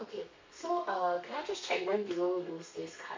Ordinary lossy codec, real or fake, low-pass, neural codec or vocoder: MP3, 48 kbps; fake; 7.2 kHz; codec, 32 kHz, 1.9 kbps, SNAC